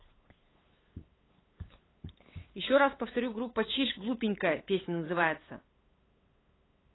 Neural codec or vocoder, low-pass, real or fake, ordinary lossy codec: codec, 16 kHz, 8 kbps, FunCodec, trained on LibriTTS, 25 frames a second; 7.2 kHz; fake; AAC, 16 kbps